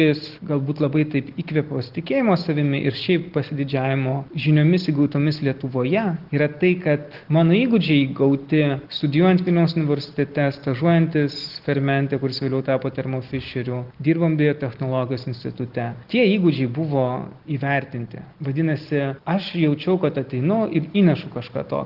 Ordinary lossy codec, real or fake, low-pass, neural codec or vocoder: Opus, 16 kbps; real; 5.4 kHz; none